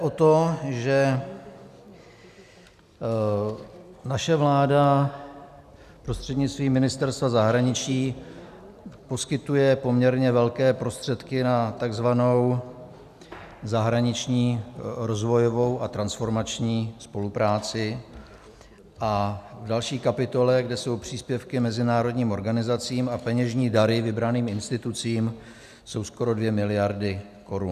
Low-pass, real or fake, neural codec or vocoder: 14.4 kHz; real; none